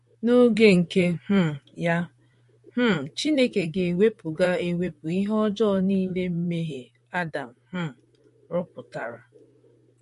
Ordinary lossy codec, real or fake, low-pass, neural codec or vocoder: MP3, 48 kbps; fake; 14.4 kHz; vocoder, 44.1 kHz, 128 mel bands, Pupu-Vocoder